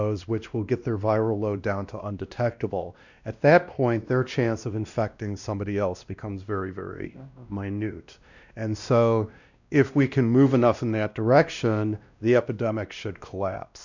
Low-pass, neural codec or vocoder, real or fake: 7.2 kHz; codec, 16 kHz, 1 kbps, X-Codec, WavLM features, trained on Multilingual LibriSpeech; fake